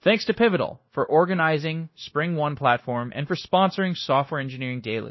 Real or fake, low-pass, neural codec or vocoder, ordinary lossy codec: fake; 7.2 kHz; codec, 16 kHz, 0.9 kbps, LongCat-Audio-Codec; MP3, 24 kbps